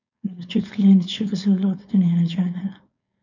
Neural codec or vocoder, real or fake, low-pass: codec, 16 kHz, 4.8 kbps, FACodec; fake; 7.2 kHz